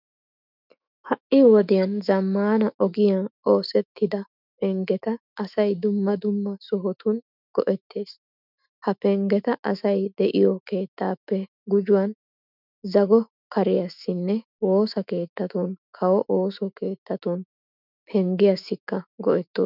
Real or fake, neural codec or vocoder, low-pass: fake; autoencoder, 48 kHz, 128 numbers a frame, DAC-VAE, trained on Japanese speech; 5.4 kHz